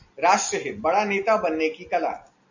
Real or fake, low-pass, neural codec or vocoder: real; 7.2 kHz; none